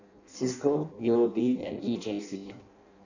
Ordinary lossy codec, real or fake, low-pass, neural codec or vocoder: none; fake; 7.2 kHz; codec, 16 kHz in and 24 kHz out, 0.6 kbps, FireRedTTS-2 codec